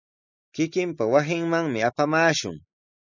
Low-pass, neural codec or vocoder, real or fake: 7.2 kHz; none; real